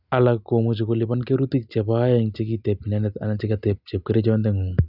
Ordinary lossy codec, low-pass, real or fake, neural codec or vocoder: none; 5.4 kHz; real; none